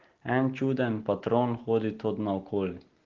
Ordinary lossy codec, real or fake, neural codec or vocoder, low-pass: Opus, 16 kbps; real; none; 7.2 kHz